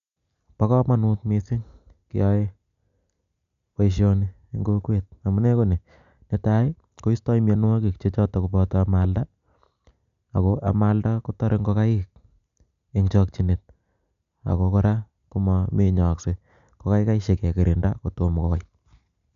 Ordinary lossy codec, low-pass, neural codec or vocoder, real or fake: none; 7.2 kHz; none; real